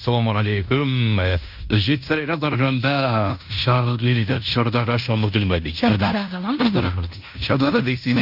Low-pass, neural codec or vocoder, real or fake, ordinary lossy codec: 5.4 kHz; codec, 16 kHz in and 24 kHz out, 0.9 kbps, LongCat-Audio-Codec, fine tuned four codebook decoder; fake; none